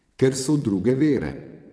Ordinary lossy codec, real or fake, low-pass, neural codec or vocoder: none; fake; none; vocoder, 22.05 kHz, 80 mel bands, WaveNeXt